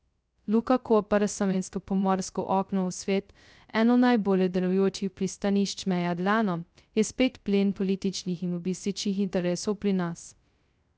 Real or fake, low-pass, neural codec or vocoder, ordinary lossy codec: fake; none; codec, 16 kHz, 0.2 kbps, FocalCodec; none